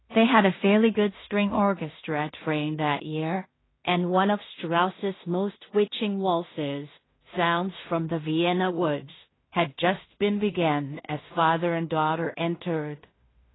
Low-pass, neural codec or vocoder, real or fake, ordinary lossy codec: 7.2 kHz; codec, 16 kHz in and 24 kHz out, 0.4 kbps, LongCat-Audio-Codec, two codebook decoder; fake; AAC, 16 kbps